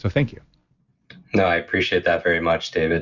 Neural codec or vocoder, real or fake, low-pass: none; real; 7.2 kHz